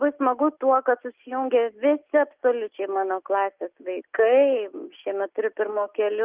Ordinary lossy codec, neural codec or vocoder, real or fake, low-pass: Opus, 32 kbps; none; real; 3.6 kHz